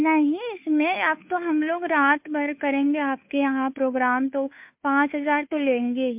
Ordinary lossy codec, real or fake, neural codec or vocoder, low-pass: MP3, 32 kbps; fake; codec, 16 kHz, 2 kbps, FunCodec, trained on Chinese and English, 25 frames a second; 3.6 kHz